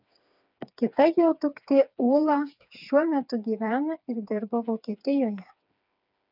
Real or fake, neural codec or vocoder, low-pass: fake; codec, 16 kHz, 4 kbps, FreqCodec, smaller model; 5.4 kHz